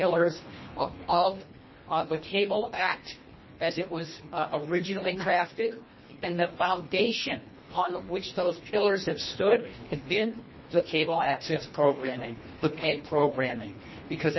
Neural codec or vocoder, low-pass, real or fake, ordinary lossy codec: codec, 24 kHz, 1.5 kbps, HILCodec; 7.2 kHz; fake; MP3, 24 kbps